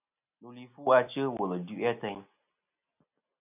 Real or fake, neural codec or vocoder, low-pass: real; none; 3.6 kHz